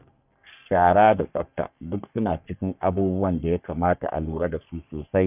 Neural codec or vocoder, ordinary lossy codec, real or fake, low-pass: codec, 44.1 kHz, 3.4 kbps, Pupu-Codec; none; fake; 3.6 kHz